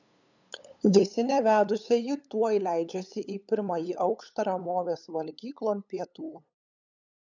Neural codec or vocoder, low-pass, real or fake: codec, 16 kHz, 8 kbps, FunCodec, trained on LibriTTS, 25 frames a second; 7.2 kHz; fake